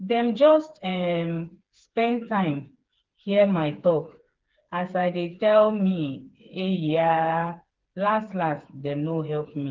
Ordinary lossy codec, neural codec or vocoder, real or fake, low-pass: Opus, 16 kbps; codec, 16 kHz, 4 kbps, FreqCodec, smaller model; fake; 7.2 kHz